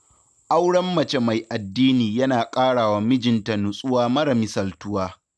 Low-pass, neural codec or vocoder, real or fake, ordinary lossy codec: none; none; real; none